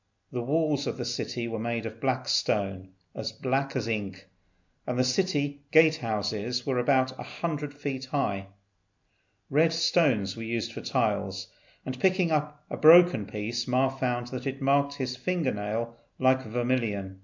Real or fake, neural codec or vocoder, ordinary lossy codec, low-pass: real; none; MP3, 64 kbps; 7.2 kHz